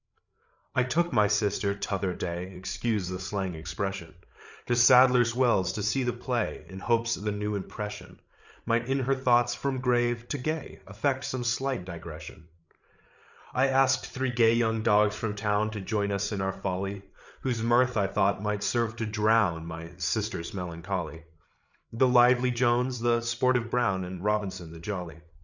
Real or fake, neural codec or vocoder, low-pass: fake; codec, 16 kHz, 8 kbps, FreqCodec, larger model; 7.2 kHz